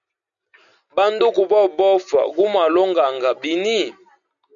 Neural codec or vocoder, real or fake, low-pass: none; real; 7.2 kHz